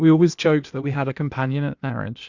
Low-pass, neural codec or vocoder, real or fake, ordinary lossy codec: 7.2 kHz; codec, 16 kHz, 0.8 kbps, ZipCodec; fake; Opus, 64 kbps